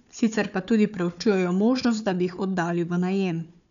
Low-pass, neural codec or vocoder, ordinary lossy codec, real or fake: 7.2 kHz; codec, 16 kHz, 4 kbps, FunCodec, trained on Chinese and English, 50 frames a second; none; fake